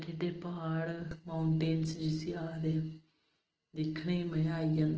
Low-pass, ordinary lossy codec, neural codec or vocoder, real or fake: 7.2 kHz; Opus, 16 kbps; none; real